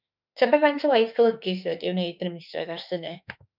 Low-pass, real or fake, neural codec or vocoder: 5.4 kHz; fake; autoencoder, 48 kHz, 32 numbers a frame, DAC-VAE, trained on Japanese speech